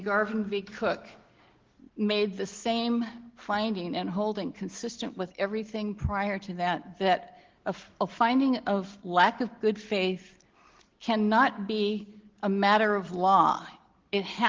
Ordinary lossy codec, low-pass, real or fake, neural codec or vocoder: Opus, 16 kbps; 7.2 kHz; real; none